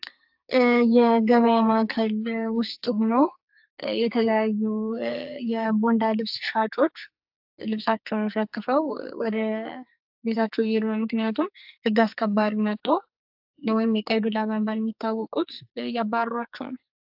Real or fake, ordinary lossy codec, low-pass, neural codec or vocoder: fake; AAC, 48 kbps; 5.4 kHz; codec, 44.1 kHz, 2.6 kbps, SNAC